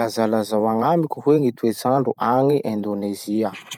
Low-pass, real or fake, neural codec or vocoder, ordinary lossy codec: 19.8 kHz; fake; vocoder, 44.1 kHz, 128 mel bands every 512 samples, BigVGAN v2; none